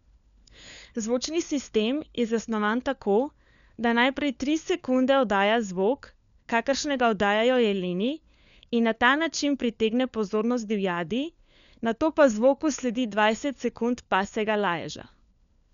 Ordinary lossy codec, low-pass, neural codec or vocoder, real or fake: none; 7.2 kHz; codec, 16 kHz, 4 kbps, FunCodec, trained on LibriTTS, 50 frames a second; fake